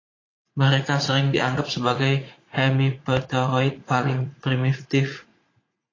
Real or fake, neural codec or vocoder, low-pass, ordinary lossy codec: fake; vocoder, 44.1 kHz, 80 mel bands, Vocos; 7.2 kHz; AAC, 32 kbps